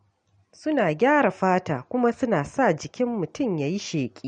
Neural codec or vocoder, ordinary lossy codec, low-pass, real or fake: none; MP3, 48 kbps; 9.9 kHz; real